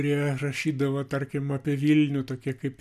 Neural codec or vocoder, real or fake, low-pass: none; real; 14.4 kHz